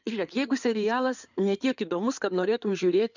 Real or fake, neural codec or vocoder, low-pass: fake; codec, 16 kHz in and 24 kHz out, 2.2 kbps, FireRedTTS-2 codec; 7.2 kHz